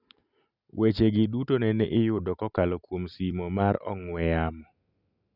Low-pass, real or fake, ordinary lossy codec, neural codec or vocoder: 5.4 kHz; real; none; none